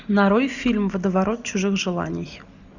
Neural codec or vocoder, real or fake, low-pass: none; real; 7.2 kHz